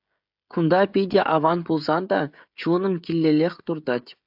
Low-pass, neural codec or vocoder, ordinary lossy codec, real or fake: 5.4 kHz; codec, 16 kHz, 16 kbps, FreqCodec, smaller model; AAC, 48 kbps; fake